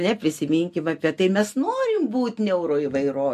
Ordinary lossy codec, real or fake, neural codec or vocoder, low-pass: MP3, 64 kbps; real; none; 14.4 kHz